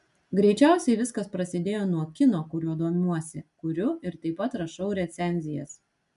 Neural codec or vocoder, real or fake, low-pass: none; real; 10.8 kHz